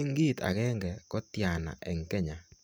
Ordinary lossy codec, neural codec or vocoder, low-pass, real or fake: none; none; none; real